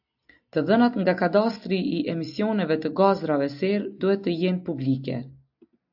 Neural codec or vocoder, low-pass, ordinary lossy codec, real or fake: none; 5.4 kHz; AAC, 48 kbps; real